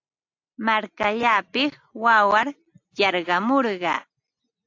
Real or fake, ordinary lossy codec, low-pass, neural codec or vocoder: real; AAC, 48 kbps; 7.2 kHz; none